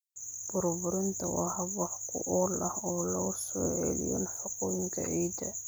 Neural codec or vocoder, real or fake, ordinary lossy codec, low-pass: none; real; none; none